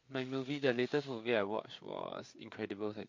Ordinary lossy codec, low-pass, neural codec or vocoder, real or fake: MP3, 48 kbps; 7.2 kHz; codec, 16 kHz, 4 kbps, FreqCodec, larger model; fake